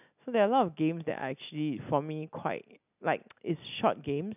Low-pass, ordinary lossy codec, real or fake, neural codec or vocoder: 3.6 kHz; none; real; none